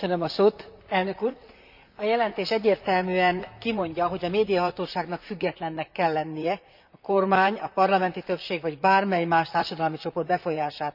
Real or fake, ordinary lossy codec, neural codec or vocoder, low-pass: fake; none; vocoder, 44.1 kHz, 128 mel bands, Pupu-Vocoder; 5.4 kHz